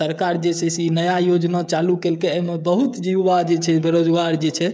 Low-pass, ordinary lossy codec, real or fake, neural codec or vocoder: none; none; fake; codec, 16 kHz, 16 kbps, FreqCodec, smaller model